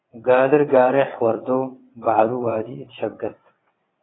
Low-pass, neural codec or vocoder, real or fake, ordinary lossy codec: 7.2 kHz; vocoder, 22.05 kHz, 80 mel bands, WaveNeXt; fake; AAC, 16 kbps